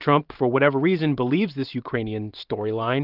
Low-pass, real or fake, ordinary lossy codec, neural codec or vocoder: 5.4 kHz; fake; Opus, 24 kbps; vocoder, 44.1 kHz, 128 mel bands every 512 samples, BigVGAN v2